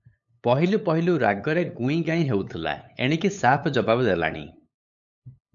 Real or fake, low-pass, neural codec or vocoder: fake; 7.2 kHz; codec, 16 kHz, 8 kbps, FunCodec, trained on LibriTTS, 25 frames a second